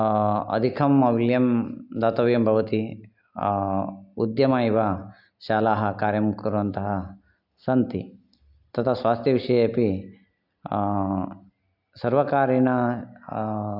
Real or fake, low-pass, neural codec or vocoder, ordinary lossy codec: real; 5.4 kHz; none; AAC, 48 kbps